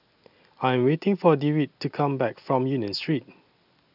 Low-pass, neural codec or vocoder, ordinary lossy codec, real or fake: 5.4 kHz; none; none; real